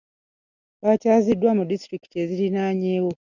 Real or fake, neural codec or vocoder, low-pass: real; none; 7.2 kHz